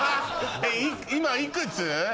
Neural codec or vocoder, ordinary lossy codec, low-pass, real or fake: none; none; none; real